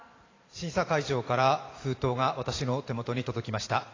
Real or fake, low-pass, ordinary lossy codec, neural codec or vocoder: fake; 7.2 kHz; AAC, 32 kbps; vocoder, 44.1 kHz, 128 mel bands every 512 samples, BigVGAN v2